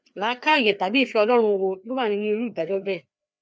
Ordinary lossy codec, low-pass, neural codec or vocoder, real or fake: none; none; codec, 16 kHz, 2 kbps, FreqCodec, larger model; fake